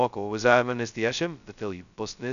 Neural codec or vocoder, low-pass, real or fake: codec, 16 kHz, 0.2 kbps, FocalCodec; 7.2 kHz; fake